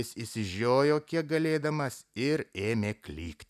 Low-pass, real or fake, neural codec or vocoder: 14.4 kHz; real; none